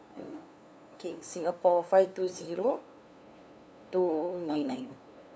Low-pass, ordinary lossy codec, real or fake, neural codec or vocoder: none; none; fake; codec, 16 kHz, 2 kbps, FunCodec, trained on LibriTTS, 25 frames a second